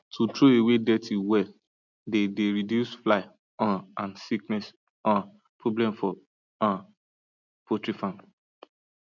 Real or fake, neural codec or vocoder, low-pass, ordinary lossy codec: real; none; 7.2 kHz; none